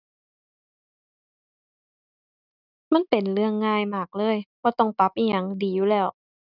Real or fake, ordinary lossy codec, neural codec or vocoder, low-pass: real; none; none; 5.4 kHz